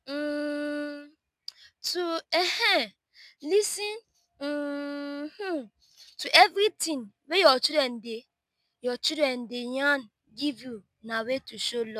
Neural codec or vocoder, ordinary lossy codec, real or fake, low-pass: none; none; real; 14.4 kHz